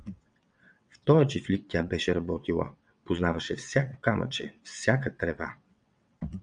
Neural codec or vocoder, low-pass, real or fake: vocoder, 22.05 kHz, 80 mel bands, WaveNeXt; 9.9 kHz; fake